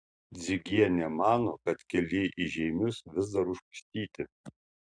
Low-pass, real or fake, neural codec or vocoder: 9.9 kHz; real; none